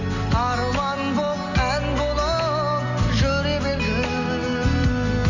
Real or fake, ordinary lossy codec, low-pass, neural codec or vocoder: real; none; 7.2 kHz; none